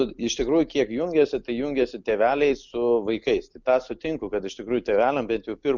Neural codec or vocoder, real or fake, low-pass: none; real; 7.2 kHz